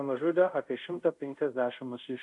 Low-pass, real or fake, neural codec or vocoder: 10.8 kHz; fake; codec, 24 kHz, 0.5 kbps, DualCodec